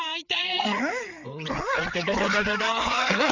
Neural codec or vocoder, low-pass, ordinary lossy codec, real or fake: codec, 16 kHz, 4 kbps, FreqCodec, larger model; 7.2 kHz; none; fake